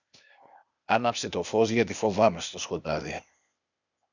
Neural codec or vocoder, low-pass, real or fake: codec, 16 kHz, 0.8 kbps, ZipCodec; 7.2 kHz; fake